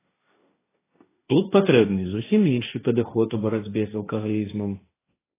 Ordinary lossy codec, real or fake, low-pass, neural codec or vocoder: AAC, 16 kbps; fake; 3.6 kHz; codec, 16 kHz, 1.1 kbps, Voila-Tokenizer